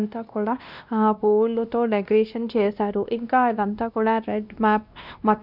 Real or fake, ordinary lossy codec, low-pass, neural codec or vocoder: fake; none; 5.4 kHz; codec, 16 kHz, 1 kbps, X-Codec, WavLM features, trained on Multilingual LibriSpeech